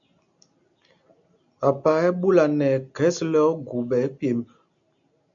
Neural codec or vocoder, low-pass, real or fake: none; 7.2 kHz; real